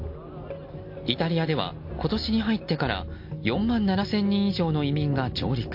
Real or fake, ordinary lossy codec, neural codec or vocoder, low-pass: real; MP3, 32 kbps; none; 5.4 kHz